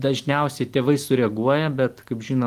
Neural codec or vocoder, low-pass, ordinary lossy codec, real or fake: none; 14.4 kHz; Opus, 24 kbps; real